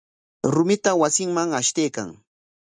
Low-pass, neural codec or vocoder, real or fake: 9.9 kHz; none; real